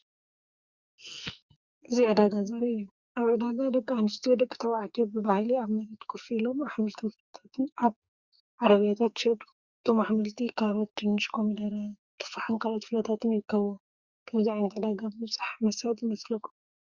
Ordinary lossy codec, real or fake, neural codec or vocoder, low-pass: Opus, 64 kbps; fake; codec, 44.1 kHz, 2.6 kbps, SNAC; 7.2 kHz